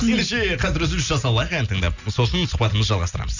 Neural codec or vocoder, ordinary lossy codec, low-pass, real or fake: none; none; 7.2 kHz; real